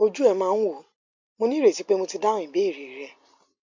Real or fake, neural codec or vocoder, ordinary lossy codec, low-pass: real; none; MP3, 64 kbps; 7.2 kHz